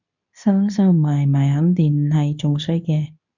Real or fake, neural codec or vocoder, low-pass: fake; codec, 24 kHz, 0.9 kbps, WavTokenizer, medium speech release version 2; 7.2 kHz